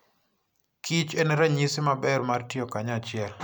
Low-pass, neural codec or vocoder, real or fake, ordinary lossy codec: none; none; real; none